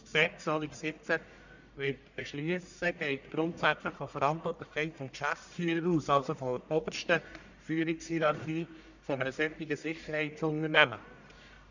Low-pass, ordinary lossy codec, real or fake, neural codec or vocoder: 7.2 kHz; none; fake; codec, 44.1 kHz, 1.7 kbps, Pupu-Codec